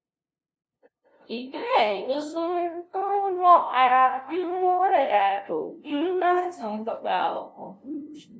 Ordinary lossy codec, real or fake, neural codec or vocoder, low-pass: none; fake; codec, 16 kHz, 0.5 kbps, FunCodec, trained on LibriTTS, 25 frames a second; none